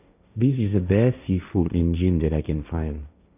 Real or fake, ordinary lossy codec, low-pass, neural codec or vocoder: fake; none; 3.6 kHz; codec, 16 kHz, 1.1 kbps, Voila-Tokenizer